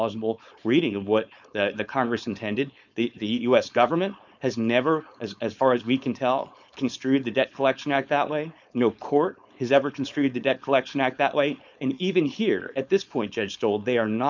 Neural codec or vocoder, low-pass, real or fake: codec, 16 kHz, 4.8 kbps, FACodec; 7.2 kHz; fake